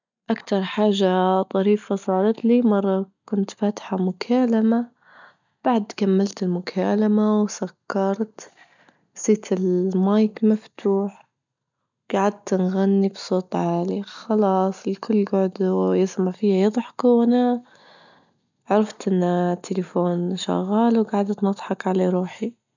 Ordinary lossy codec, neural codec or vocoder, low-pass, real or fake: none; none; 7.2 kHz; real